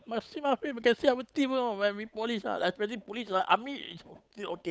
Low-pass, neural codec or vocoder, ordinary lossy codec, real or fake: none; codec, 16 kHz, 8 kbps, FunCodec, trained on Chinese and English, 25 frames a second; none; fake